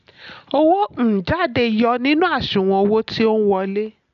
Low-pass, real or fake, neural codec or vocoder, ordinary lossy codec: 7.2 kHz; real; none; none